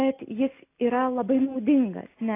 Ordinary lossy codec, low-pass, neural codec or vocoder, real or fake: AAC, 24 kbps; 3.6 kHz; none; real